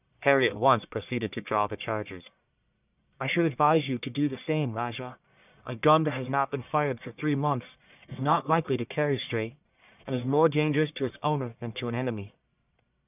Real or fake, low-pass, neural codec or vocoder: fake; 3.6 kHz; codec, 44.1 kHz, 1.7 kbps, Pupu-Codec